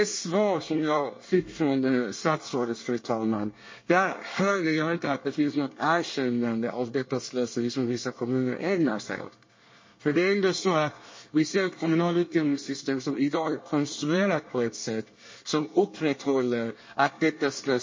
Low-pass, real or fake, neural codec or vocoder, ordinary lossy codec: 7.2 kHz; fake; codec, 24 kHz, 1 kbps, SNAC; MP3, 32 kbps